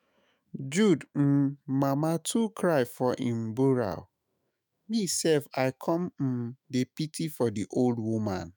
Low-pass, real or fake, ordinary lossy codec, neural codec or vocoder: none; fake; none; autoencoder, 48 kHz, 128 numbers a frame, DAC-VAE, trained on Japanese speech